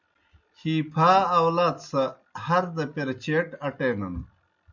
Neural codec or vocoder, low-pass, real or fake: none; 7.2 kHz; real